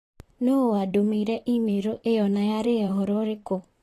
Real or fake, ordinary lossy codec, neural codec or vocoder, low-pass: fake; AAC, 48 kbps; vocoder, 44.1 kHz, 128 mel bands, Pupu-Vocoder; 14.4 kHz